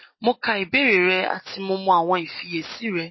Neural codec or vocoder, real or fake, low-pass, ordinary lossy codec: none; real; 7.2 kHz; MP3, 24 kbps